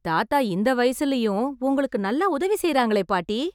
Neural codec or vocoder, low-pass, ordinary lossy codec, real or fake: none; 19.8 kHz; none; real